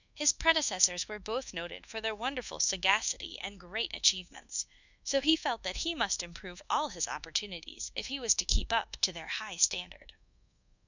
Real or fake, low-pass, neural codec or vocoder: fake; 7.2 kHz; codec, 24 kHz, 1.2 kbps, DualCodec